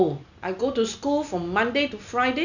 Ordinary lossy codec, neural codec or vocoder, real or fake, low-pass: none; none; real; 7.2 kHz